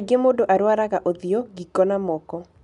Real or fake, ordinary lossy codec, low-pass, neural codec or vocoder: real; none; 10.8 kHz; none